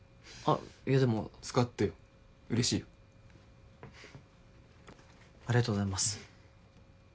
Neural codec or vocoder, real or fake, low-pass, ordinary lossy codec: none; real; none; none